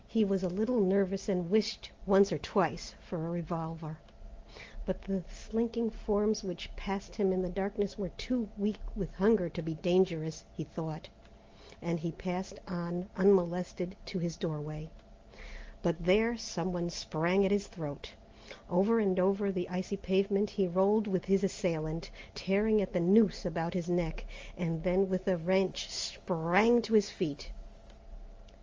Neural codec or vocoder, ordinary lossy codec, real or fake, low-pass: none; Opus, 32 kbps; real; 7.2 kHz